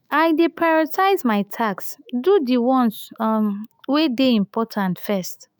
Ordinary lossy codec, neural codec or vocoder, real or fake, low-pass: none; autoencoder, 48 kHz, 128 numbers a frame, DAC-VAE, trained on Japanese speech; fake; none